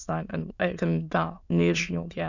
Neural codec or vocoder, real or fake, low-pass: autoencoder, 22.05 kHz, a latent of 192 numbers a frame, VITS, trained on many speakers; fake; 7.2 kHz